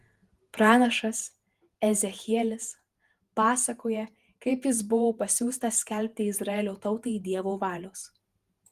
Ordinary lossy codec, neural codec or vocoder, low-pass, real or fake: Opus, 24 kbps; vocoder, 48 kHz, 128 mel bands, Vocos; 14.4 kHz; fake